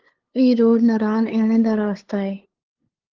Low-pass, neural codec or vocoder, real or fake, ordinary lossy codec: 7.2 kHz; codec, 16 kHz, 2 kbps, FunCodec, trained on LibriTTS, 25 frames a second; fake; Opus, 16 kbps